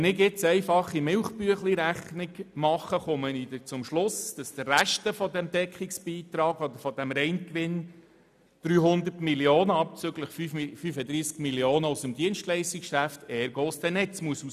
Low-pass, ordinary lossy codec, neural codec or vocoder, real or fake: 14.4 kHz; none; none; real